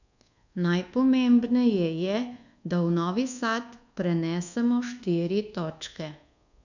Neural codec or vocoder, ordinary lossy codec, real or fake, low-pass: codec, 24 kHz, 1.2 kbps, DualCodec; none; fake; 7.2 kHz